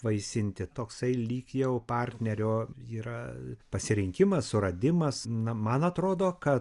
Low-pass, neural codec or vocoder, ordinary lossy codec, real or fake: 10.8 kHz; none; Opus, 64 kbps; real